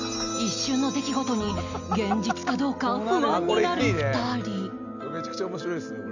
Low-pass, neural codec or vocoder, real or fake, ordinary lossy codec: 7.2 kHz; none; real; none